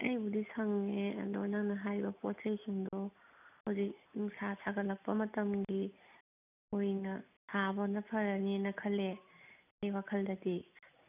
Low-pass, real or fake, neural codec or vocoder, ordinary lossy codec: 3.6 kHz; real; none; none